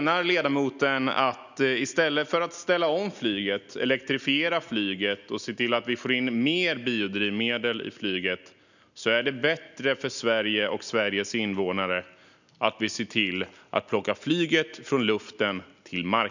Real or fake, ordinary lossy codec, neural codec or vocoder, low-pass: real; none; none; 7.2 kHz